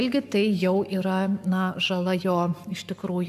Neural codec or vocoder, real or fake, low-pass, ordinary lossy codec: codec, 44.1 kHz, 7.8 kbps, Pupu-Codec; fake; 14.4 kHz; AAC, 96 kbps